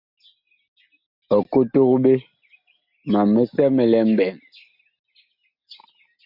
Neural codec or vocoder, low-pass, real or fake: none; 5.4 kHz; real